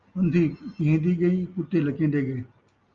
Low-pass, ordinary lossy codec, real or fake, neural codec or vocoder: 7.2 kHz; Opus, 32 kbps; real; none